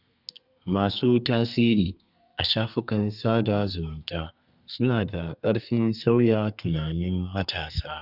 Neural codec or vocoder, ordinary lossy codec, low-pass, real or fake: codec, 32 kHz, 1.9 kbps, SNAC; none; 5.4 kHz; fake